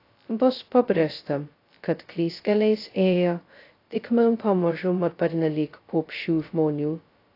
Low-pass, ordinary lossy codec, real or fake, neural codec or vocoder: 5.4 kHz; AAC, 32 kbps; fake; codec, 16 kHz, 0.2 kbps, FocalCodec